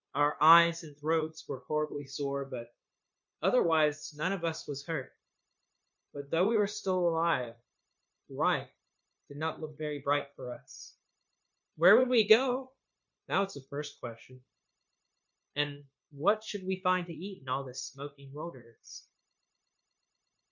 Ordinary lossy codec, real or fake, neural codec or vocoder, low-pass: MP3, 48 kbps; fake; codec, 16 kHz, 0.9 kbps, LongCat-Audio-Codec; 7.2 kHz